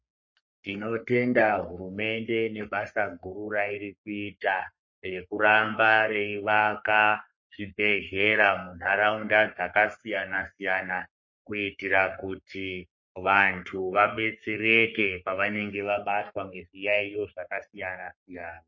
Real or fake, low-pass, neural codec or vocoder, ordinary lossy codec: fake; 7.2 kHz; codec, 44.1 kHz, 3.4 kbps, Pupu-Codec; MP3, 32 kbps